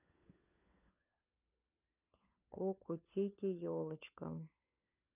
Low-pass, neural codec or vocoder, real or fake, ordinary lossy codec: 3.6 kHz; codec, 16 kHz, 4 kbps, FunCodec, trained on LibriTTS, 50 frames a second; fake; none